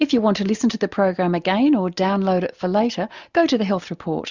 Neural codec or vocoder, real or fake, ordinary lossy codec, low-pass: none; real; Opus, 64 kbps; 7.2 kHz